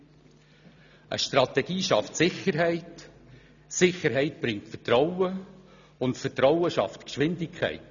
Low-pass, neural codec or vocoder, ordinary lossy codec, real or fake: 7.2 kHz; none; none; real